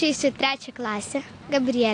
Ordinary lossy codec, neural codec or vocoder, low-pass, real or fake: AAC, 48 kbps; none; 9.9 kHz; real